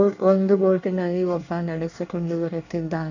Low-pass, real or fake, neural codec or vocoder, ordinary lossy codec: 7.2 kHz; fake; codec, 24 kHz, 1 kbps, SNAC; none